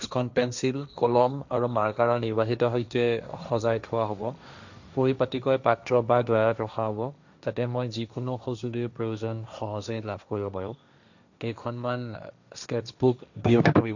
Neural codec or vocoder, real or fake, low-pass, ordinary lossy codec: codec, 16 kHz, 1.1 kbps, Voila-Tokenizer; fake; 7.2 kHz; none